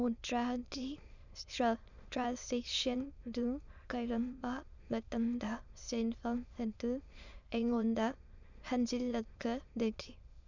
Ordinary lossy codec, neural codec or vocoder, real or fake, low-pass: none; autoencoder, 22.05 kHz, a latent of 192 numbers a frame, VITS, trained on many speakers; fake; 7.2 kHz